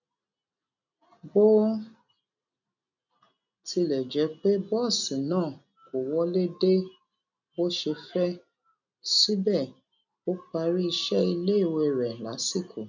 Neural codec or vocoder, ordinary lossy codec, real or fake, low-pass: none; none; real; 7.2 kHz